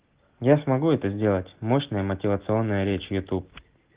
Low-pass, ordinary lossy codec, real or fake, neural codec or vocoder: 3.6 kHz; Opus, 16 kbps; real; none